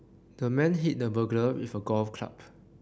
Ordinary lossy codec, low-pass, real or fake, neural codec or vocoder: none; none; real; none